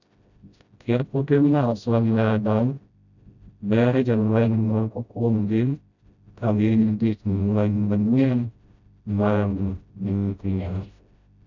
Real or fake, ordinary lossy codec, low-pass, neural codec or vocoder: fake; none; 7.2 kHz; codec, 16 kHz, 0.5 kbps, FreqCodec, smaller model